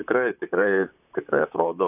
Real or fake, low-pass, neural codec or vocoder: fake; 3.6 kHz; vocoder, 44.1 kHz, 128 mel bands, Pupu-Vocoder